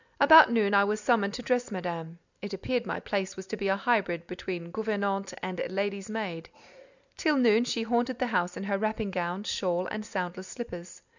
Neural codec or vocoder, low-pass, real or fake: none; 7.2 kHz; real